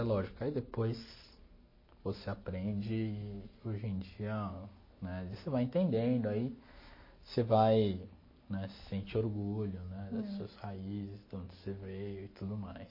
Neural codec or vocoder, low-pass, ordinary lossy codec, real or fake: none; 5.4 kHz; MP3, 24 kbps; real